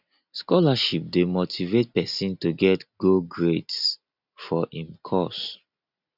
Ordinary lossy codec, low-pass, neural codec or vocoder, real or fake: none; 5.4 kHz; none; real